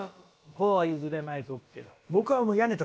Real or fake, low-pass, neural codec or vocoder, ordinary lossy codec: fake; none; codec, 16 kHz, about 1 kbps, DyCAST, with the encoder's durations; none